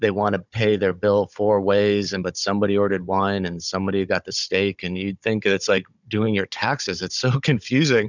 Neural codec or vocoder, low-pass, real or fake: codec, 16 kHz, 16 kbps, FunCodec, trained on Chinese and English, 50 frames a second; 7.2 kHz; fake